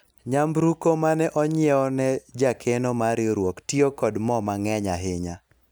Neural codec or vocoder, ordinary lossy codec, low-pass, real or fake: none; none; none; real